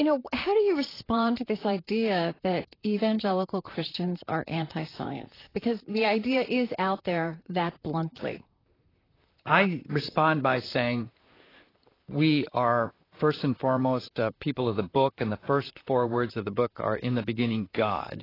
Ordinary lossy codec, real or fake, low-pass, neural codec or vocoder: AAC, 24 kbps; fake; 5.4 kHz; vocoder, 44.1 kHz, 128 mel bands, Pupu-Vocoder